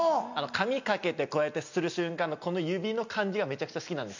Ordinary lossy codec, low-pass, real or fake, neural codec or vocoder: none; 7.2 kHz; real; none